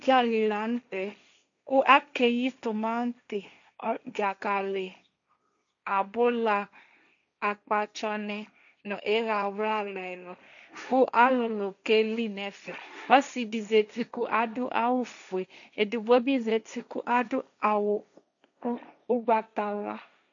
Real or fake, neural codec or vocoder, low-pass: fake; codec, 16 kHz, 1.1 kbps, Voila-Tokenizer; 7.2 kHz